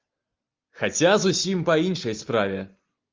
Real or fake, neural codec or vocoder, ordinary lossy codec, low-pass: real; none; Opus, 32 kbps; 7.2 kHz